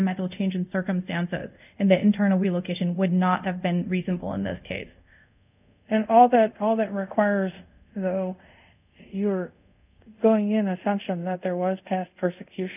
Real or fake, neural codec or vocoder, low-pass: fake; codec, 24 kHz, 0.5 kbps, DualCodec; 3.6 kHz